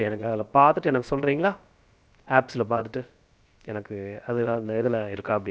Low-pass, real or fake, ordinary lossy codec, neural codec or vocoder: none; fake; none; codec, 16 kHz, about 1 kbps, DyCAST, with the encoder's durations